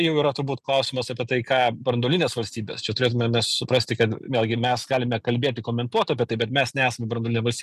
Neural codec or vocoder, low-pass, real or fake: none; 14.4 kHz; real